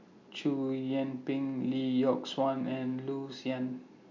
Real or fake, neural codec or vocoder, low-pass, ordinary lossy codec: real; none; 7.2 kHz; MP3, 64 kbps